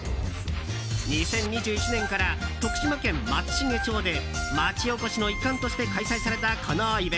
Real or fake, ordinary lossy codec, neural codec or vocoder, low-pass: real; none; none; none